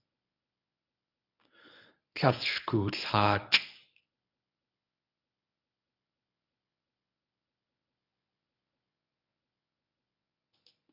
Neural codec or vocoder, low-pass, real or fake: codec, 24 kHz, 0.9 kbps, WavTokenizer, medium speech release version 1; 5.4 kHz; fake